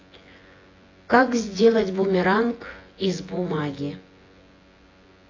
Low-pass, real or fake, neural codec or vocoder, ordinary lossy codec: 7.2 kHz; fake; vocoder, 24 kHz, 100 mel bands, Vocos; AAC, 32 kbps